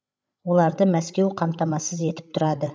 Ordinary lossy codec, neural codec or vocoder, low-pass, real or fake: none; codec, 16 kHz, 16 kbps, FreqCodec, larger model; none; fake